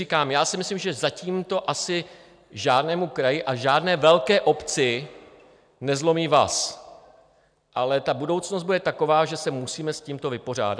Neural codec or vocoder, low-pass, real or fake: none; 9.9 kHz; real